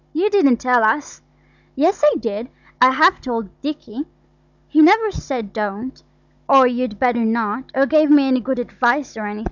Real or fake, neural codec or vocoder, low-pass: fake; codec, 16 kHz, 16 kbps, FunCodec, trained on Chinese and English, 50 frames a second; 7.2 kHz